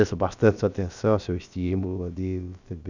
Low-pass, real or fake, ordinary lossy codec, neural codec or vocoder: 7.2 kHz; fake; none; codec, 16 kHz, about 1 kbps, DyCAST, with the encoder's durations